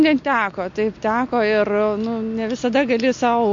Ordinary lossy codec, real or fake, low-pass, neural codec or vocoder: MP3, 48 kbps; real; 7.2 kHz; none